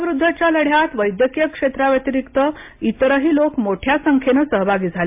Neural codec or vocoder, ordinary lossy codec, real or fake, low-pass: none; MP3, 32 kbps; real; 3.6 kHz